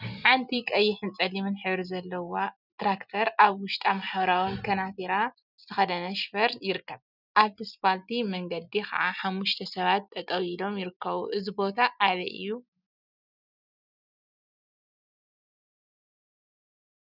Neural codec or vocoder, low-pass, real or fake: none; 5.4 kHz; real